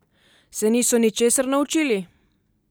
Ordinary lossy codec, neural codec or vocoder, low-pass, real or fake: none; none; none; real